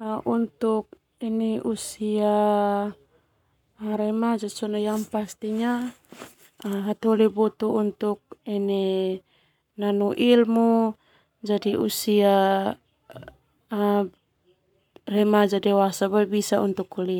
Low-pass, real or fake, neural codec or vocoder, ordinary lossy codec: 19.8 kHz; fake; codec, 44.1 kHz, 7.8 kbps, Pupu-Codec; none